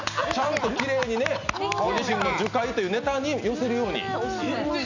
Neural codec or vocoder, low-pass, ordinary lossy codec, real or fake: none; 7.2 kHz; none; real